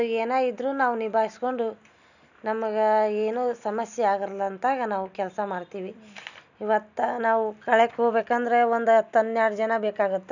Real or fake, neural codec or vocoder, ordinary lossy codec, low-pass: real; none; none; 7.2 kHz